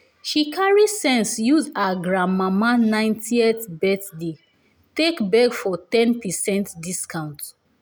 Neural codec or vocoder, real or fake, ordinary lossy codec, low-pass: none; real; none; none